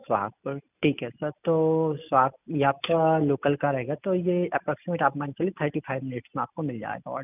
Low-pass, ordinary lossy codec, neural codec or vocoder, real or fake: 3.6 kHz; none; none; real